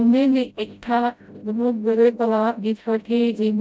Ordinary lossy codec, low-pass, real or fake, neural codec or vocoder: none; none; fake; codec, 16 kHz, 0.5 kbps, FreqCodec, smaller model